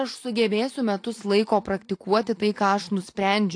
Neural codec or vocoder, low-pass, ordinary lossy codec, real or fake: none; 9.9 kHz; AAC, 48 kbps; real